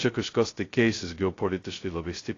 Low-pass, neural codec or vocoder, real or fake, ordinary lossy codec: 7.2 kHz; codec, 16 kHz, 0.2 kbps, FocalCodec; fake; AAC, 32 kbps